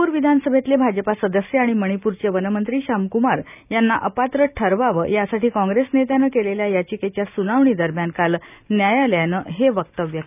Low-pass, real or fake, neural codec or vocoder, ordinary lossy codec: 3.6 kHz; real; none; none